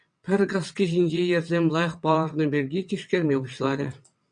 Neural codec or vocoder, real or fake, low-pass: vocoder, 22.05 kHz, 80 mel bands, WaveNeXt; fake; 9.9 kHz